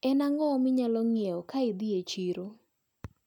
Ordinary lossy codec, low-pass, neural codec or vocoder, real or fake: none; 19.8 kHz; none; real